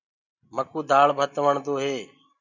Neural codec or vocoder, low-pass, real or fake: none; 7.2 kHz; real